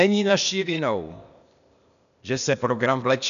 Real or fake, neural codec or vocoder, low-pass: fake; codec, 16 kHz, 0.8 kbps, ZipCodec; 7.2 kHz